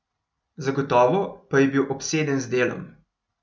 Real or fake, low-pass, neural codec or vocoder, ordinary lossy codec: real; none; none; none